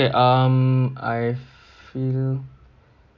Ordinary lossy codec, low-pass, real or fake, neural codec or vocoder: none; 7.2 kHz; real; none